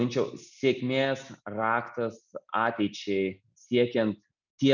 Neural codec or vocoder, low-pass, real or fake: none; 7.2 kHz; real